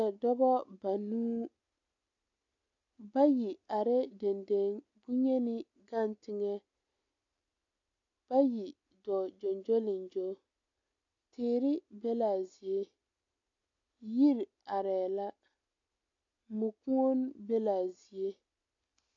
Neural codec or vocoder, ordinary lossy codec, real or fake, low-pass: none; AAC, 48 kbps; real; 7.2 kHz